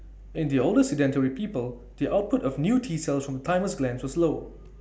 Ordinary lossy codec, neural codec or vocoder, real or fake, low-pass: none; none; real; none